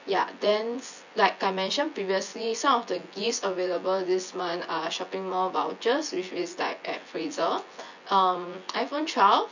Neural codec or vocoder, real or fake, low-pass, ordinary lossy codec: vocoder, 24 kHz, 100 mel bands, Vocos; fake; 7.2 kHz; none